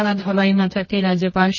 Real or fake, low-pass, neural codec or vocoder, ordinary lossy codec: fake; 7.2 kHz; codec, 24 kHz, 0.9 kbps, WavTokenizer, medium music audio release; MP3, 32 kbps